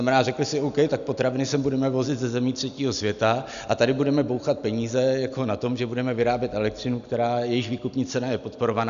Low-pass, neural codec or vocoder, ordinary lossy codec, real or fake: 7.2 kHz; none; MP3, 96 kbps; real